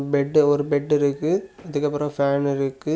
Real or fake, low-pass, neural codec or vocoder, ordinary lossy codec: real; none; none; none